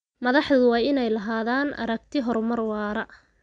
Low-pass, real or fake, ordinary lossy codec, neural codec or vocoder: 9.9 kHz; real; none; none